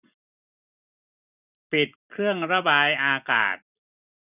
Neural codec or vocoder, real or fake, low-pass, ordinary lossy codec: vocoder, 44.1 kHz, 128 mel bands every 256 samples, BigVGAN v2; fake; 3.6 kHz; none